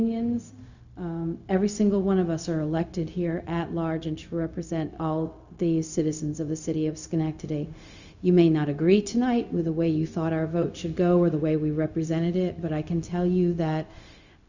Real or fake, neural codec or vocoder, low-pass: fake; codec, 16 kHz, 0.4 kbps, LongCat-Audio-Codec; 7.2 kHz